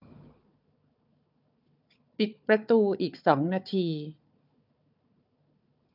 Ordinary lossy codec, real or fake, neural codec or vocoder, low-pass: none; fake; codec, 16 kHz, 4 kbps, FunCodec, trained on Chinese and English, 50 frames a second; 5.4 kHz